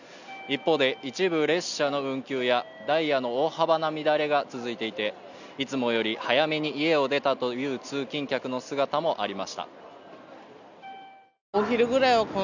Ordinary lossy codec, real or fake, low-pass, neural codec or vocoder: none; real; 7.2 kHz; none